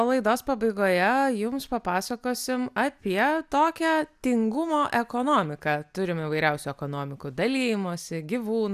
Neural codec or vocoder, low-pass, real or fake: none; 14.4 kHz; real